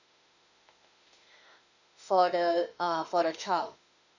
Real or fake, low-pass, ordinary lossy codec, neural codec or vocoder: fake; 7.2 kHz; none; autoencoder, 48 kHz, 32 numbers a frame, DAC-VAE, trained on Japanese speech